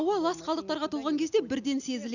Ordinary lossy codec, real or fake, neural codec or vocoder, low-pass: none; real; none; 7.2 kHz